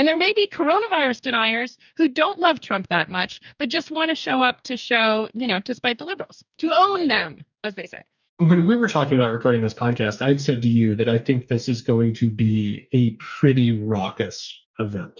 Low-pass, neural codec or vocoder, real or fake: 7.2 kHz; codec, 44.1 kHz, 2.6 kbps, DAC; fake